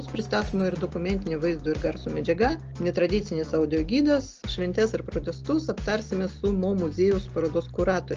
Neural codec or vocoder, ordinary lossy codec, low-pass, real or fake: none; Opus, 24 kbps; 7.2 kHz; real